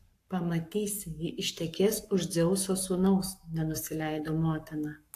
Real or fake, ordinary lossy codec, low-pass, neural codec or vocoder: fake; AAC, 64 kbps; 14.4 kHz; codec, 44.1 kHz, 7.8 kbps, Pupu-Codec